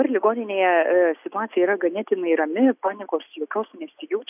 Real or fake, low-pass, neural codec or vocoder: real; 3.6 kHz; none